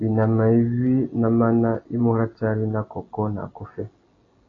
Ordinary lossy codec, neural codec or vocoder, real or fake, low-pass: AAC, 32 kbps; none; real; 7.2 kHz